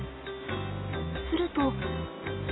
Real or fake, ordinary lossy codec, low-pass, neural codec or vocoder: real; AAC, 16 kbps; 7.2 kHz; none